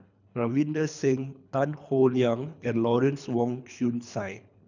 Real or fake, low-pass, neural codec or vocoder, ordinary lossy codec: fake; 7.2 kHz; codec, 24 kHz, 3 kbps, HILCodec; none